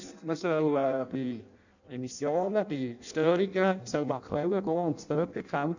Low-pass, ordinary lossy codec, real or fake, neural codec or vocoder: 7.2 kHz; none; fake; codec, 16 kHz in and 24 kHz out, 0.6 kbps, FireRedTTS-2 codec